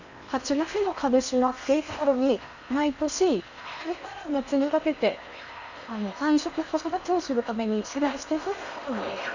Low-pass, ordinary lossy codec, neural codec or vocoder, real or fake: 7.2 kHz; none; codec, 16 kHz in and 24 kHz out, 0.8 kbps, FocalCodec, streaming, 65536 codes; fake